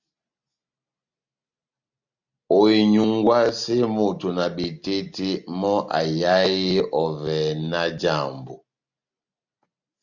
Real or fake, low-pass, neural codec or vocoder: real; 7.2 kHz; none